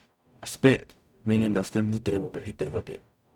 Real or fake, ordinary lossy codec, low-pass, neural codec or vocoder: fake; none; 19.8 kHz; codec, 44.1 kHz, 0.9 kbps, DAC